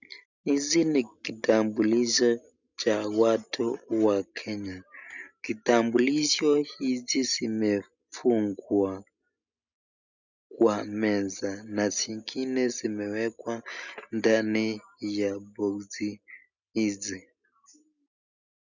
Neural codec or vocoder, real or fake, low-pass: none; real; 7.2 kHz